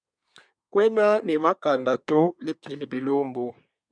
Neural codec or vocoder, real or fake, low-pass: codec, 24 kHz, 1 kbps, SNAC; fake; 9.9 kHz